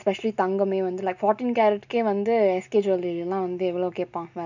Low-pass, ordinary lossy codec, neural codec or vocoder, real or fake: 7.2 kHz; none; none; real